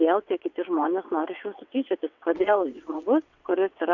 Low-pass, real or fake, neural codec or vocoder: 7.2 kHz; fake; vocoder, 22.05 kHz, 80 mel bands, WaveNeXt